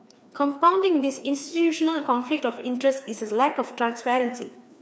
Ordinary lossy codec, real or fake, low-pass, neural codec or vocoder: none; fake; none; codec, 16 kHz, 2 kbps, FreqCodec, larger model